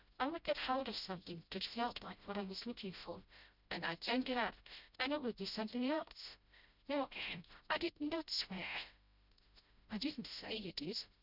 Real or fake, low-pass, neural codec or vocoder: fake; 5.4 kHz; codec, 16 kHz, 0.5 kbps, FreqCodec, smaller model